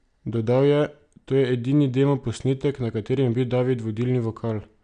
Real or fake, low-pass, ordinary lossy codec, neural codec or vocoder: real; 9.9 kHz; none; none